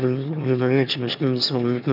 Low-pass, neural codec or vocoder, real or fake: 5.4 kHz; autoencoder, 22.05 kHz, a latent of 192 numbers a frame, VITS, trained on one speaker; fake